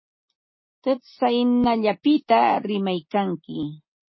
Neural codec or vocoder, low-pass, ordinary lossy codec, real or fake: none; 7.2 kHz; MP3, 24 kbps; real